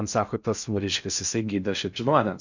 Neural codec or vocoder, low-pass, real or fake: codec, 16 kHz in and 24 kHz out, 0.6 kbps, FocalCodec, streaming, 2048 codes; 7.2 kHz; fake